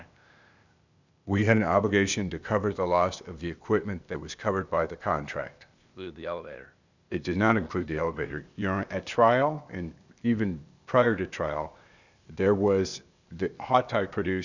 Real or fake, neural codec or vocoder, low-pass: fake; codec, 16 kHz, 0.8 kbps, ZipCodec; 7.2 kHz